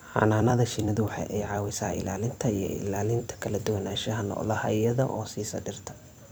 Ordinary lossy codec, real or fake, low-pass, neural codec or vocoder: none; fake; none; vocoder, 44.1 kHz, 128 mel bands every 256 samples, BigVGAN v2